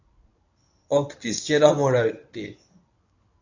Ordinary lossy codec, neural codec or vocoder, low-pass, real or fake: AAC, 48 kbps; codec, 24 kHz, 0.9 kbps, WavTokenizer, medium speech release version 1; 7.2 kHz; fake